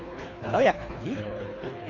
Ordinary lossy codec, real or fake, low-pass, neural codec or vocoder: AAC, 32 kbps; fake; 7.2 kHz; codec, 24 kHz, 3 kbps, HILCodec